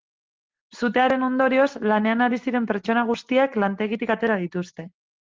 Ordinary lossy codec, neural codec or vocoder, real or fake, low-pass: Opus, 16 kbps; none; real; 7.2 kHz